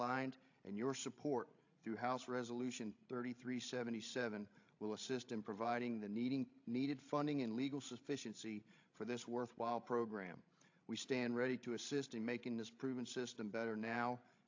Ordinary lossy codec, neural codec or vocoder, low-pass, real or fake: AAC, 48 kbps; codec, 16 kHz, 16 kbps, FreqCodec, larger model; 7.2 kHz; fake